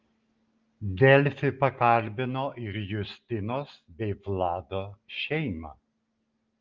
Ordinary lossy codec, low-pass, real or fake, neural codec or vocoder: Opus, 32 kbps; 7.2 kHz; real; none